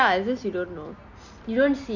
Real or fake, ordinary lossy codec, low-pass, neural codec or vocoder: real; none; 7.2 kHz; none